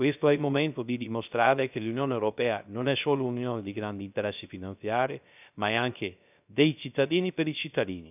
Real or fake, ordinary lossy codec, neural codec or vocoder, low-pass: fake; none; codec, 16 kHz, 0.3 kbps, FocalCodec; 3.6 kHz